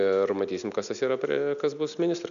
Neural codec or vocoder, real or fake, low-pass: none; real; 7.2 kHz